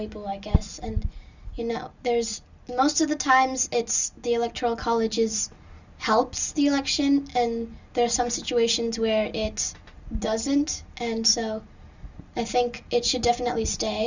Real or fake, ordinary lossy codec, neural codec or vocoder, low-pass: real; Opus, 64 kbps; none; 7.2 kHz